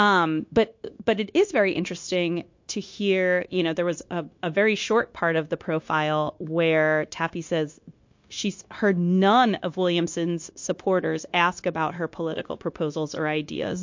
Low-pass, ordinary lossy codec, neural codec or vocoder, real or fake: 7.2 kHz; MP3, 48 kbps; codec, 16 kHz, 0.9 kbps, LongCat-Audio-Codec; fake